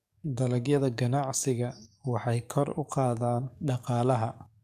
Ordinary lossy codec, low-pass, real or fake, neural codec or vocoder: MP3, 96 kbps; 14.4 kHz; fake; codec, 44.1 kHz, 7.8 kbps, DAC